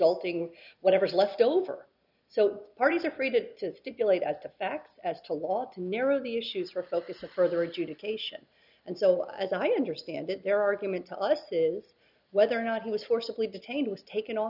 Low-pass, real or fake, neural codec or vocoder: 5.4 kHz; real; none